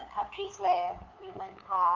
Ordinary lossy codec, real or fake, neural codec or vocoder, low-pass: Opus, 24 kbps; fake; codec, 16 kHz, 2 kbps, FunCodec, trained on LibriTTS, 25 frames a second; 7.2 kHz